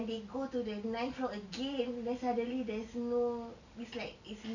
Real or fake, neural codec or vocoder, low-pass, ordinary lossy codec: real; none; 7.2 kHz; none